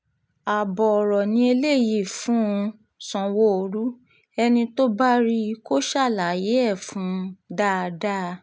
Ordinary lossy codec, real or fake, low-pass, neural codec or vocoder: none; real; none; none